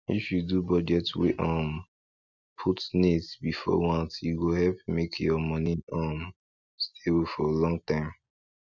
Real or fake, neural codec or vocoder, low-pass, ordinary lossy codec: real; none; 7.2 kHz; none